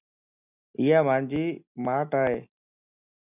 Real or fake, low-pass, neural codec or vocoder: real; 3.6 kHz; none